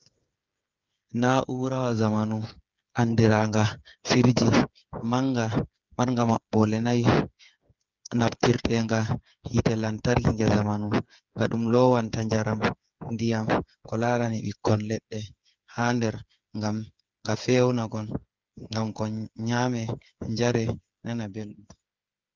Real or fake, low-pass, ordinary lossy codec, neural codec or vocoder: fake; 7.2 kHz; Opus, 24 kbps; codec, 16 kHz, 8 kbps, FreqCodec, smaller model